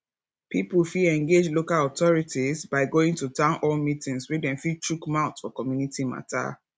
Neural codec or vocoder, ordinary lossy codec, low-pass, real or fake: none; none; none; real